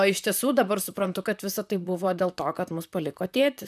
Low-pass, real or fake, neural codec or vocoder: 14.4 kHz; fake; vocoder, 44.1 kHz, 128 mel bands, Pupu-Vocoder